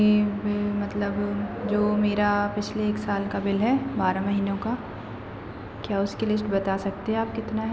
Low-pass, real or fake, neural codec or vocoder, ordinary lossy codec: none; real; none; none